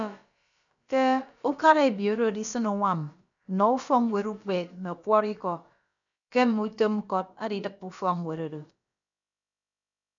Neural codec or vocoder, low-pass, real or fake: codec, 16 kHz, about 1 kbps, DyCAST, with the encoder's durations; 7.2 kHz; fake